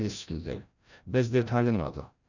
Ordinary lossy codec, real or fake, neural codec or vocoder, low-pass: none; fake; codec, 16 kHz, 0.5 kbps, FreqCodec, larger model; 7.2 kHz